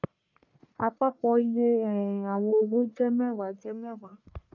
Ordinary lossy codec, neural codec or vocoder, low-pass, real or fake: none; codec, 44.1 kHz, 1.7 kbps, Pupu-Codec; 7.2 kHz; fake